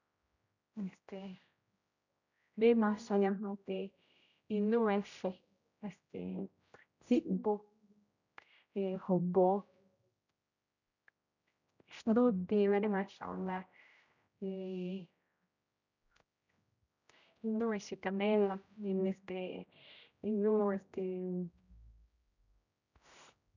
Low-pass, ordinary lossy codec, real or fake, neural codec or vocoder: 7.2 kHz; none; fake; codec, 16 kHz, 0.5 kbps, X-Codec, HuBERT features, trained on general audio